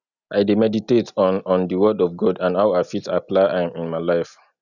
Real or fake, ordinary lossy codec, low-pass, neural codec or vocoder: real; none; 7.2 kHz; none